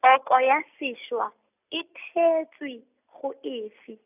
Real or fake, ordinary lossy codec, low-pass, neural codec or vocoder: real; none; 3.6 kHz; none